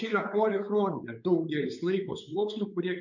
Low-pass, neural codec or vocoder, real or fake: 7.2 kHz; codec, 16 kHz, 4 kbps, X-Codec, WavLM features, trained on Multilingual LibriSpeech; fake